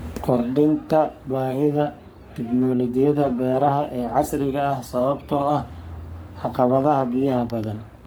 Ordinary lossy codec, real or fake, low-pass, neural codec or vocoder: none; fake; none; codec, 44.1 kHz, 3.4 kbps, Pupu-Codec